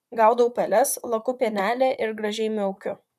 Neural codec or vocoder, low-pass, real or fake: vocoder, 44.1 kHz, 128 mel bands, Pupu-Vocoder; 14.4 kHz; fake